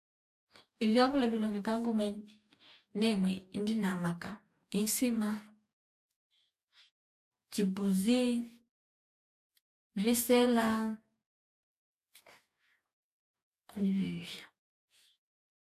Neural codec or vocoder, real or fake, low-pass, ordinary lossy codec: codec, 44.1 kHz, 2.6 kbps, DAC; fake; 14.4 kHz; none